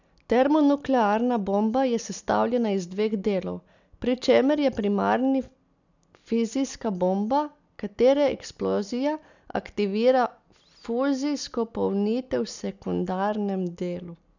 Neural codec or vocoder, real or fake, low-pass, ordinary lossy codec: none; real; 7.2 kHz; none